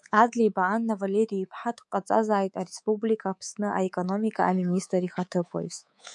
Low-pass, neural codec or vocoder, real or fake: 10.8 kHz; codec, 24 kHz, 3.1 kbps, DualCodec; fake